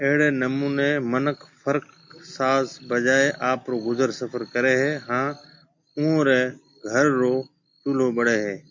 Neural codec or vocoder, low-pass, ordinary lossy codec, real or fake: none; 7.2 kHz; MP3, 48 kbps; real